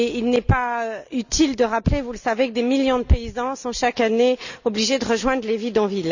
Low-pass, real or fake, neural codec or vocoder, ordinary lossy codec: 7.2 kHz; real; none; none